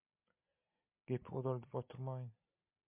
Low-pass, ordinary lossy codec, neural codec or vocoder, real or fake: 3.6 kHz; MP3, 32 kbps; none; real